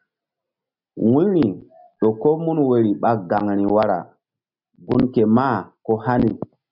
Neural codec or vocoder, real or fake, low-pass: none; real; 5.4 kHz